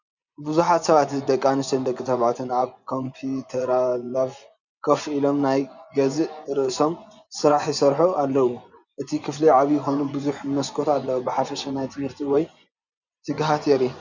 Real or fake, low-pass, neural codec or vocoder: fake; 7.2 kHz; vocoder, 24 kHz, 100 mel bands, Vocos